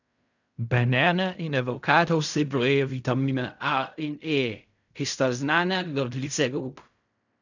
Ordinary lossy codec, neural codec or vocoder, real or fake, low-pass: none; codec, 16 kHz in and 24 kHz out, 0.4 kbps, LongCat-Audio-Codec, fine tuned four codebook decoder; fake; 7.2 kHz